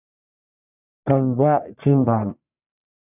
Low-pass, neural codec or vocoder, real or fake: 3.6 kHz; codec, 44.1 kHz, 1.7 kbps, Pupu-Codec; fake